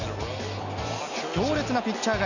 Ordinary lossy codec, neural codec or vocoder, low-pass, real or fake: none; none; 7.2 kHz; real